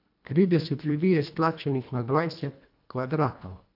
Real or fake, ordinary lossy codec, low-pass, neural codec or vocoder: fake; none; 5.4 kHz; codec, 24 kHz, 1.5 kbps, HILCodec